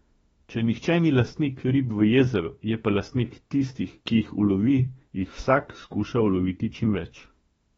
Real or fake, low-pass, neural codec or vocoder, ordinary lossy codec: fake; 19.8 kHz; autoencoder, 48 kHz, 32 numbers a frame, DAC-VAE, trained on Japanese speech; AAC, 24 kbps